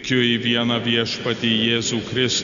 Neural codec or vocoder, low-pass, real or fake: none; 7.2 kHz; real